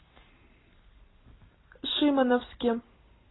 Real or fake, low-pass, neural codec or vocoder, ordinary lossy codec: real; 7.2 kHz; none; AAC, 16 kbps